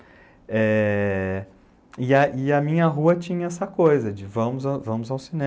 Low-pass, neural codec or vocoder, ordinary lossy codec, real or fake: none; none; none; real